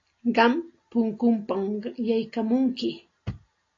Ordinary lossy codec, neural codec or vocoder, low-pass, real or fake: AAC, 32 kbps; none; 7.2 kHz; real